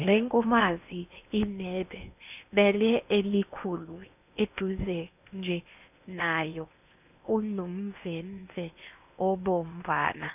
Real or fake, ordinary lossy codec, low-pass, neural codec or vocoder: fake; none; 3.6 kHz; codec, 16 kHz in and 24 kHz out, 0.8 kbps, FocalCodec, streaming, 65536 codes